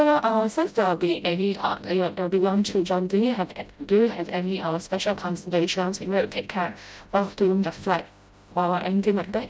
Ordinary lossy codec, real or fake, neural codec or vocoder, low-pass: none; fake; codec, 16 kHz, 0.5 kbps, FreqCodec, smaller model; none